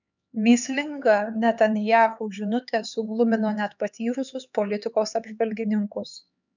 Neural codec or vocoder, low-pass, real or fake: codec, 16 kHz, 4 kbps, X-Codec, HuBERT features, trained on LibriSpeech; 7.2 kHz; fake